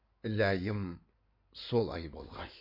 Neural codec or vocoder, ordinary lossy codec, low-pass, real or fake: codec, 16 kHz in and 24 kHz out, 2.2 kbps, FireRedTTS-2 codec; MP3, 32 kbps; 5.4 kHz; fake